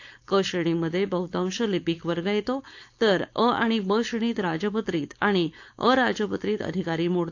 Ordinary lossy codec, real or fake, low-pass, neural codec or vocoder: AAC, 48 kbps; fake; 7.2 kHz; codec, 16 kHz, 4.8 kbps, FACodec